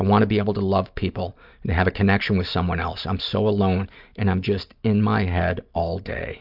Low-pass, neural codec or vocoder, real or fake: 5.4 kHz; none; real